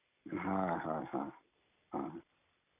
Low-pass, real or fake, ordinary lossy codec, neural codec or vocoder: 3.6 kHz; fake; none; vocoder, 44.1 kHz, 128 mel bands every 256 samples, BigVGAN v2